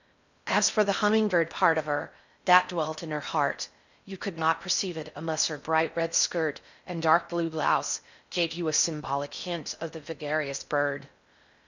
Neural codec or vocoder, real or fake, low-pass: codec, 16 kHz in and 24 kHz out, 0.6 kbps, FocalCodec, streaming, 4096 codes; fake; 7.2 kHz